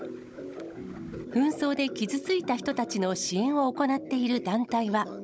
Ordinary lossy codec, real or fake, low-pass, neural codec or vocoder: none; fake; none; codec, 16 kHz, 16 kbps, FunCodec, trained on Chinese and English, 50 frames a second